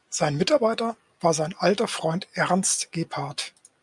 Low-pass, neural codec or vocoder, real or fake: 10.8 kHz; none; real